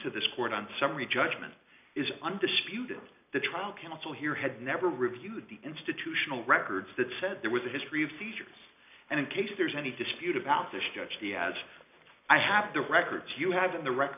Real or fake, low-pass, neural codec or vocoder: real; 3.6 kHz; none